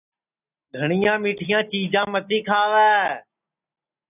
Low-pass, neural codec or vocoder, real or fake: 3.6 kHz; none; real